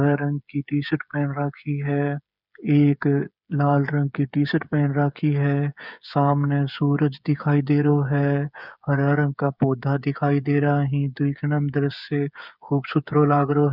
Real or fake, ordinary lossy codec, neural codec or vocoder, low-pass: fake; none; codec, 16 kHz, 8 kbps, FreqCodec, smaller model; 5.4 kHz